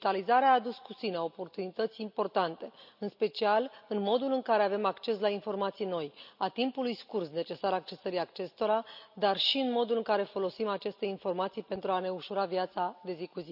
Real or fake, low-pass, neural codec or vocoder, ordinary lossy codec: real; 5.4 kHz; none; none